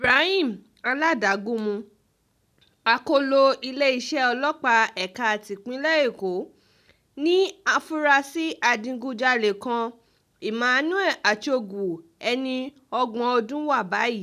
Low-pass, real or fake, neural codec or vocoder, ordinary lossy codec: 14.4 kHz; real; none; none